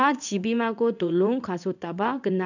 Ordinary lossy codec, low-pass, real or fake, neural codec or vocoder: none; 7.2 kHz; fake; codec, 16 kHz in and 24 kHz out, 1 kbps, XY-Tokenizer